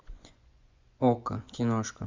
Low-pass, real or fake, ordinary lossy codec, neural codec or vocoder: 7.2 kHz; fake; MP3, 64 kbps; vocoder, 24 kHz, 100 mel bands, Vocos